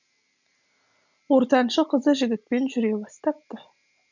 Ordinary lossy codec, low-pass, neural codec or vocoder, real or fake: none; 7.2 kHz; none; real